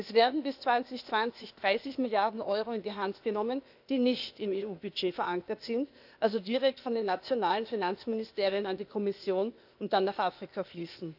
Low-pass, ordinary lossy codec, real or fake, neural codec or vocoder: 5.4 kHz; none; fake; codec, 16 kHz, 0.8 kbps, ZipCodec